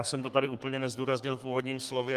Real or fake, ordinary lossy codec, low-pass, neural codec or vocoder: fake; Opus, 32 kbps; 14.4 kHz; codec, 32 kHz, 1.9 kbps, SNAC